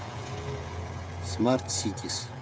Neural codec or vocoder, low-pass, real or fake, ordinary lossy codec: codec, 16 kHz, 16 kbps, FreqCodec, smaller model; none; fake; none